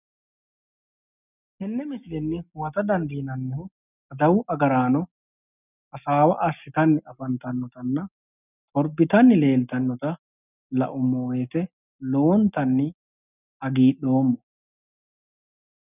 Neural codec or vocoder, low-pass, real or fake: none; 3.6 kHz; real